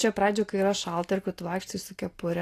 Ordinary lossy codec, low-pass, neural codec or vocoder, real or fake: AAC, 48 kbps; 14.4 kHz; none; real